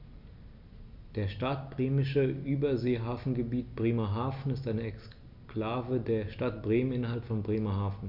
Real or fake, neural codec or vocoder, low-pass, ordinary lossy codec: real; none; 5.4 kHz; none